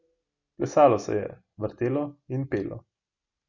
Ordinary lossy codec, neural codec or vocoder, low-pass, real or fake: Opus, 64 kbps; none; 7.2 kHz; real